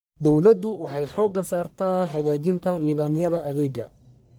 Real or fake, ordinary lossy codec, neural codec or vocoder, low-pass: fake; none; codec, 44.1 kHz, 1.7 kbps, Pupu-Codec; none